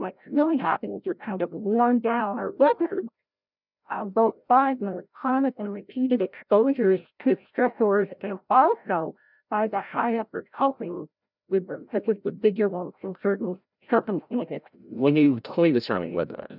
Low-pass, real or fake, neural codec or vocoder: 5.4 kHz; fake; codec, 16 kHz, 0.5 kbps, FreqCodec, larger model